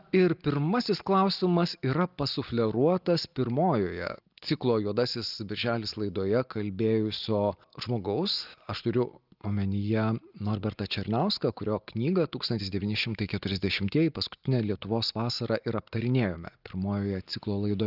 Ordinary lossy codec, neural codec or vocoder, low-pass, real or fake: Opus, 24 kbps; none; 5.4 kHz; real